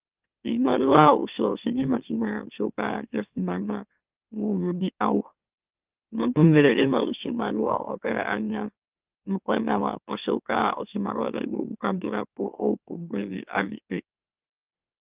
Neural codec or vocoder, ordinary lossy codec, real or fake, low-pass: autoencoder, 44.1 kHz, a latent of 192 numbers a frame, MeloTTS; Opus, 24 kbps; fake; 3.6 kHz